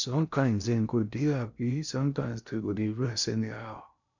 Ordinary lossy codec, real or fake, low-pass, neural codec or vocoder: none; fake; 7.2 kHz; codec, 16 kHz in and 24 kHz out, 0.6 kbps, FocalCodec, streaming, 2048 codes